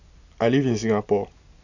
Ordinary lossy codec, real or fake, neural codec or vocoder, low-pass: none; real; none; 7.2 kHz